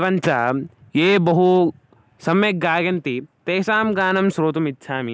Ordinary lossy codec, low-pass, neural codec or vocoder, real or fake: none; none; none; real